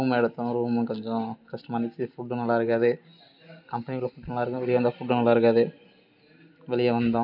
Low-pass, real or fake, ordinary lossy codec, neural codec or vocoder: 5.4 kHz; real; none; none